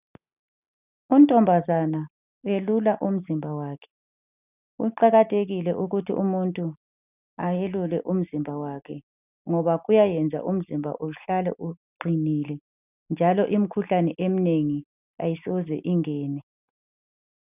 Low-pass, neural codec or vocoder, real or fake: 3.6 kHz; none; real